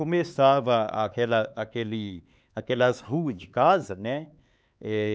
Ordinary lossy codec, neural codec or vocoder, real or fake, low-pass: none; codec, 16 kHz, 4 kbps, X-Codec, HuBERT features, trained on LibriSpeech; fake; none